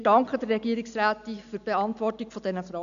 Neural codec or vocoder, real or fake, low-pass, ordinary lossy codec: none; real; 7.2 kHz; none